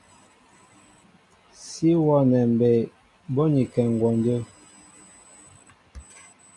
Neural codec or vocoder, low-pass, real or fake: none; 10.8 kHz; real